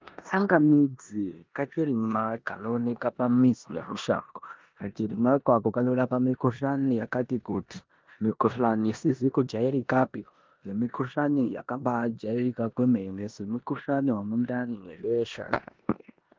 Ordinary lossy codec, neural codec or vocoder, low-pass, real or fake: Opus, 32 kbps; codec, 16 kHz in and 24 kHz out, 0.9 kbps, LongCat-Audio-Codec, four codebook decoder; 7.2 kHz; fake